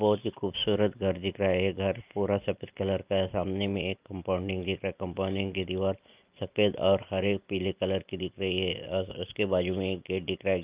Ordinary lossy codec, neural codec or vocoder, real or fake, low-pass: Opus, 24 kbps; none; real; 3.6 kHz